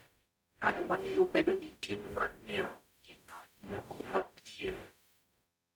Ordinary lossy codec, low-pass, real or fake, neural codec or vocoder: none; none; fake; codec, 44.1 kHz, 0.9 kbps, DAC